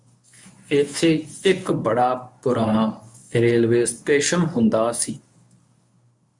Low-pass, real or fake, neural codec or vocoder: 10.8 kHz; fake; codec, 24 kHz, 0.9 kbps, WavTokenizer, medium speech release version 1